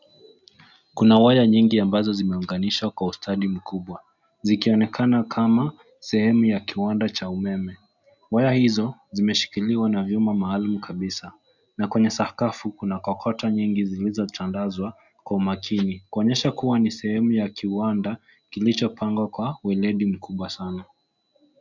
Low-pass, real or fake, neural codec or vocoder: 7.2 kHz; real; none